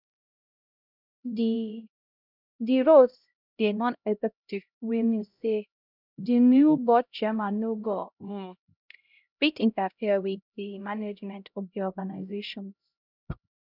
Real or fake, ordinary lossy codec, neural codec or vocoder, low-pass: fake; none; codec, 16 kHz, 0.5 kbps, X-Codec, HuBERT features, trained on LibriSpeech; 5.4 kHz